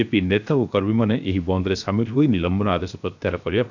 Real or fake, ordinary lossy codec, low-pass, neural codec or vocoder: fake; none; 7.2 kHz; codec, 16 kHz, 0.7 kbps, FocalCodec